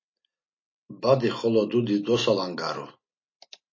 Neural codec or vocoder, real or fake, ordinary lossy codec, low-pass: none; real; MP3, 32 kbps; 7.2 kHz